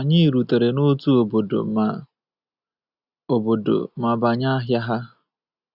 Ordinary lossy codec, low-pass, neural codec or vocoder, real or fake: none; 5.4 kHz; none; real